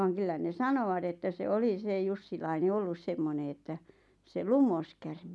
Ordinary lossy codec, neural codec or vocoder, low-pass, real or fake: none; none; none; real